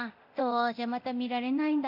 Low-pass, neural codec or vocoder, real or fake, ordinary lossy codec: 5.4 kHz; codec, 24 kHz, 0.9 kbps, DualCodec; fake; none